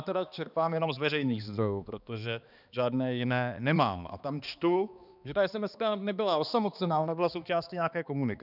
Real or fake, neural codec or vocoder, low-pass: fake; codec, 16 kHz, 2 kbps, X-Codec, HuBERT features, trained on balanced general audio; 5.4 kHz